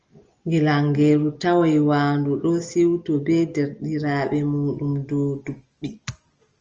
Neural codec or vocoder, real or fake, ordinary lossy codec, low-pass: none; real; Opus, 24 kbps; 7.2 kHz